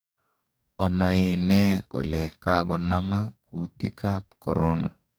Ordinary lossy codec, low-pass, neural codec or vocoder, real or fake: none; none; codec, 44.1 kHz, 2.6 kbps, DAC; fake